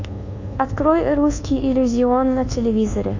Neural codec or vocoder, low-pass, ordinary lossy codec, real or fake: codec, 24 kHz, 1.2 kbps, DualCodec; 7.2 kHz; AAC, 48 kbps; fake